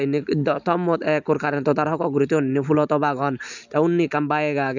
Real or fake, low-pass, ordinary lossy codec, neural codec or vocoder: real; 7.2 kHz; none; none